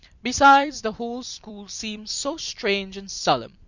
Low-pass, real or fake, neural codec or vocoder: 7.2 kHz; fake; vocoder, 44.1 kHz, 128 mel bands every 256 samples, BigVGAN v2